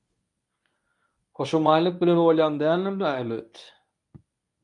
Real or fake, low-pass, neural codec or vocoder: fake; 10.8 kHz; codec, 24 kHz, 0.9 kbps, WavTokenizer, medium speech release version 1